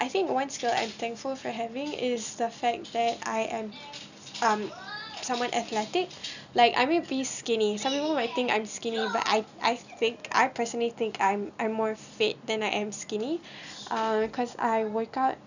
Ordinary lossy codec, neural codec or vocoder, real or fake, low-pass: none; none; real; 7.2 kHz